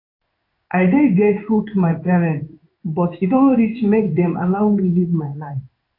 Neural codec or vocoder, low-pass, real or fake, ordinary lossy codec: codec, 16 kHz in and 24 kHz out, 1 kbps, XY-Tokenizer; 5.4 kHz; fake; AAC, 32 kbps